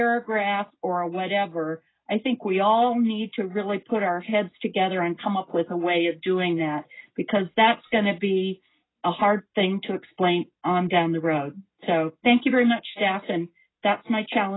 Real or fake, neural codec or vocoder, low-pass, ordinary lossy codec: real; none; 7.2 kHz; AAC, 16 kbps